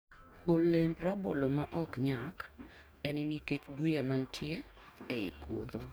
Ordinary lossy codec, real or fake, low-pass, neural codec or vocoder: none; fake; none; codec, 44.1 kHz, 2.6 kbps, DAC